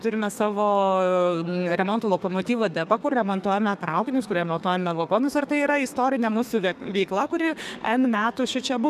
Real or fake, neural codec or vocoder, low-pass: fake; codec, 32 kHz, 1.9 kbps, SNAC; 14.4 kHz